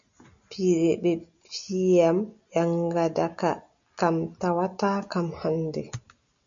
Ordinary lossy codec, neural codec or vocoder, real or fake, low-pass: MP3, 64 kbps; none; real; 7.2 kHz